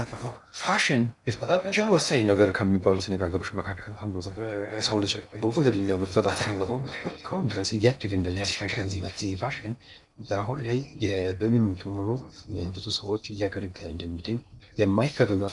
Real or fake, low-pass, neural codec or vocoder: fake; 10.8 kHz; codec, 16 kHz in and 24 kHz out, 0.6 kbps, FocalCodec, streaming, 2048 codes